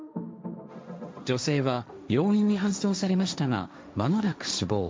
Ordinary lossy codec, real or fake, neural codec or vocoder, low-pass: none; fake; codec, 16 kHz, 1.1 kbps, Voila-Tokenizer; none